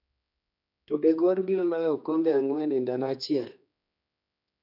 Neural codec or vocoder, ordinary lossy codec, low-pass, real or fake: codec, 16 kHz, 2 kbps, X-Codec, HuBERT features, trained on general audio; none; 5.4 kHz; fake